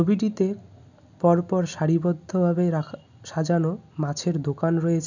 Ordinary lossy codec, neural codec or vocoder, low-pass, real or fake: none; none; 7.2 kHz; real